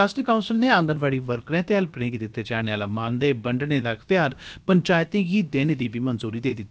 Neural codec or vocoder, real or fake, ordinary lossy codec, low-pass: codec, 16 kHz, about 1 kbps, DyCAST, with the encoder's durations; fake; none; none